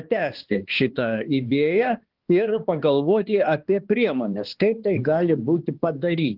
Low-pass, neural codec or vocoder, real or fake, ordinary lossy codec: 5.4 kHz; codec, 16 kHz, 2 kbps, X-Codec, HuBERT features, trained on balanced general audio; fake; Opus, 16 kbps